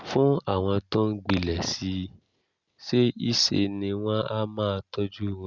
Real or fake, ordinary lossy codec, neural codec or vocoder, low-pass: real; Opus, 64 kbps; none; 7.2 kHz